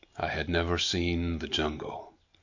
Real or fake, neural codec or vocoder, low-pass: real; none; 7.2 kHz